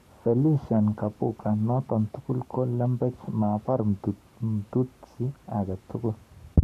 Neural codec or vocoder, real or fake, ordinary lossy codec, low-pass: codec, 44.1 kHz, 7.8 kbps, Pupu-Codec; fake; none; 14.4 kHz